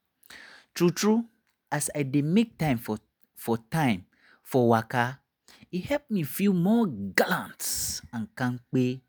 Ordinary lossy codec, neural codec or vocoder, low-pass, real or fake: none; none; none; real